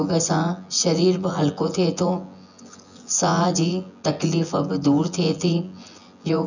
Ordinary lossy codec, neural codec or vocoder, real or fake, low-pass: none; vocoder, 24 kHz, 100 mel bands, Vocos; fake; 7.2 kHz